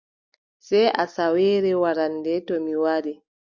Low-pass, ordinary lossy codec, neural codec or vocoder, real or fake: 7.2 kHz; Opus, 64 kbps; none; real